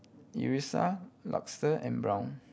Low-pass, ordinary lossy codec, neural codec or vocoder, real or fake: none; none; none; real